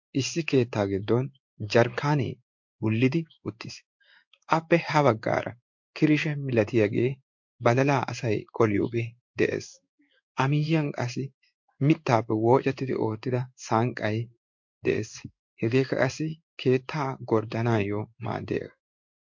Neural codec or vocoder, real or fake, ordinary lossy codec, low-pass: codec, 16 kHz in and 24 kHz out, 1 kbps, XY-Tokenizer; fake; MP3, 48 kbps; 7.2 kHz